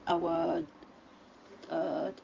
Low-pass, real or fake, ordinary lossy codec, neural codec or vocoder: 7.2 kHz; fake; Opus, 32 kbps; codec, 16 kHz in and 24 kHz out, 2.2 kbps, FireRedTTS-2 codec